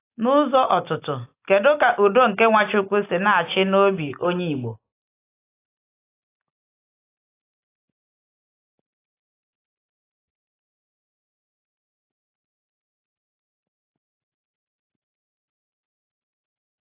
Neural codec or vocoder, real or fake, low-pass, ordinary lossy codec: none; real; 3.6 kHz; AAC, 24 kbps